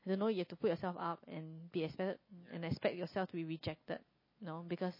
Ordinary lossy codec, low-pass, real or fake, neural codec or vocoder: MP3, 24 kbps; 5.4 kHz; real; none